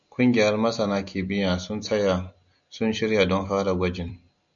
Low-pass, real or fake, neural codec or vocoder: 7.2 kHz; real; none